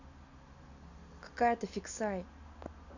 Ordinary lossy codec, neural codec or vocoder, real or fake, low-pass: none; none; real; 7.2 kHz